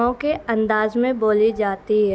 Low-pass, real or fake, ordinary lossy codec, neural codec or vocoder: none; real; none; none